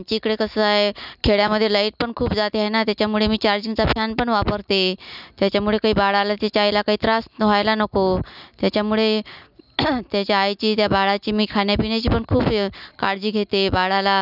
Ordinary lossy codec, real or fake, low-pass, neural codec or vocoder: none; real; 5.4 kHz; none